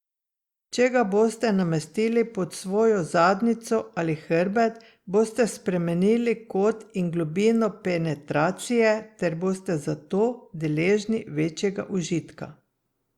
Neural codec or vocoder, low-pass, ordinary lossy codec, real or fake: none; 19.8 kHz; Opus, 64 kbps; real